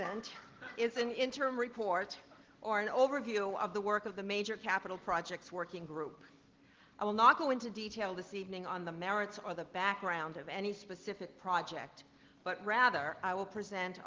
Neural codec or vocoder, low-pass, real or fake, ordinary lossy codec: none; 7.2 kHz; real; Opus, 16 kbps